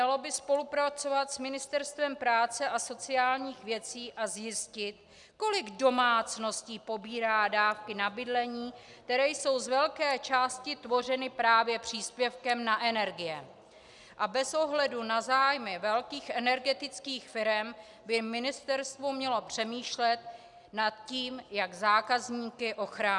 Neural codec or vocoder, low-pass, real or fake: none; 10.8 kHz; real